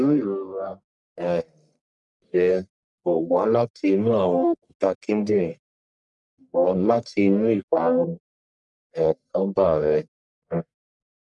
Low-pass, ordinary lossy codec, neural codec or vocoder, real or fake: 10.8 kHz; none; codec, 44.1 kHz, 1.7 kbps, Pupu-Codec; fake